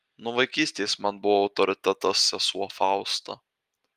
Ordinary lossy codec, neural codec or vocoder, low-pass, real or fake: Opus, 32 kbps; none; 14.4 kHz; real